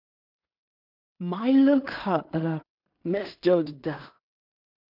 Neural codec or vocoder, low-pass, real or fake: codec, 16 kHz in and 24 kHz out, 0.4 kbps, LongCat-Audio-Codec, two codebook decoder; 5.4 kHz; fake